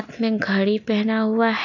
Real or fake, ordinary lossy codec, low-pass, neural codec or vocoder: real; none; 7.2 kHz; none